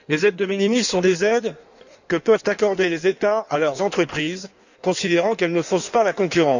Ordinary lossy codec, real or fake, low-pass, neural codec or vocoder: none; fake; 7.2 kHz; codec, 16 kHz in and 24 kHz out, 1.1 kbps, FireRedTTS-2 codec